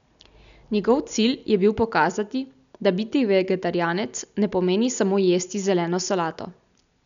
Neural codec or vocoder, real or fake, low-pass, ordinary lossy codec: none; real; 7.2 kHz; none